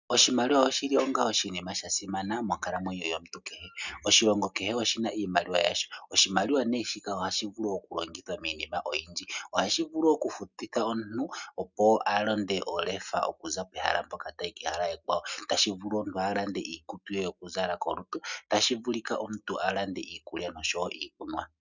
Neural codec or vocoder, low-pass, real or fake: none; 7.2 kHz; real